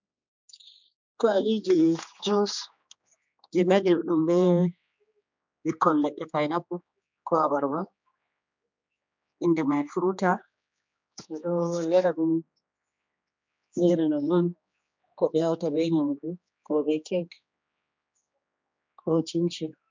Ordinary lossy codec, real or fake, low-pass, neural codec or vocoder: MP3, 64 kbps; fake; 7.2 kHz; codec, 16 kHz, 2 kbps, X-Codec, HuBERT features, trained on general audio